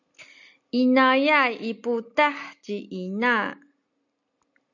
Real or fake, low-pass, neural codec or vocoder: real; 7.2 kHz; none